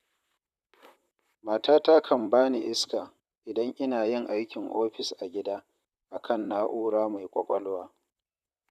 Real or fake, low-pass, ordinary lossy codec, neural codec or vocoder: fake; 14.4 kHz; none; vocoder, 44.1 kHz, 128 mel bands, Pupu-Vocoder